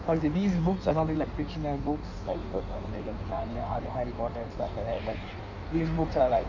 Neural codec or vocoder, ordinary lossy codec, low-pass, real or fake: codec, 16 kHz in and 24 kHz out, 1.1 kbps, FireRedTTS-2 codec; none; 7.2 kHz; fake